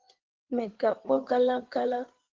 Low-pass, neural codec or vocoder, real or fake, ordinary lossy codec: 7.2 kHz; codec, 16 kHz in and 24 kHz out, 2.2 kbps, FireRedTTS-2 codec; fake; Opus, 16 kbps